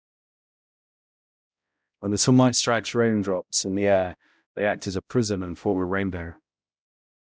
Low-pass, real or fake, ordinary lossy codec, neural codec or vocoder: none; fake; none; codec, 16 kHz, 0.5 kbps, X-Codec, HuBERT features, trained on balanced general audio